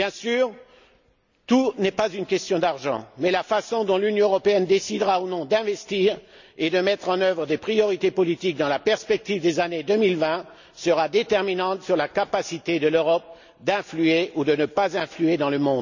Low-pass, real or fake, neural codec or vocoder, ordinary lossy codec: 7.2 kHz; real; none; none